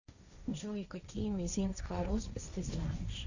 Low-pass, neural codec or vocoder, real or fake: 7.2 kHz; codec, 16 kHz, 1.1 kbps, Voila-Tokenizer; fake